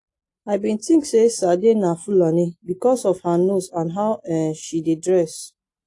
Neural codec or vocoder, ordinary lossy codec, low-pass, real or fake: none; AAC, 48 kbps; 10.8 kHz; real